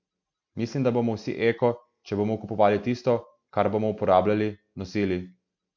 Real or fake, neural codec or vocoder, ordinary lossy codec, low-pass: real; none; MP3, 48 kbps; 7.2 kHz